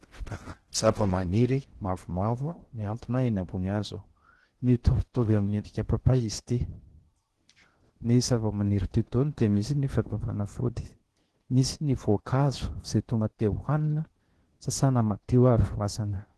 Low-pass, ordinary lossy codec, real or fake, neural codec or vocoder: 10.8 kHz; Opus, 16 kbps; fake; codec, 16 kHz in and 24 kHz out, 0.6 kbps, FocalCodec, streaming, 4096 codes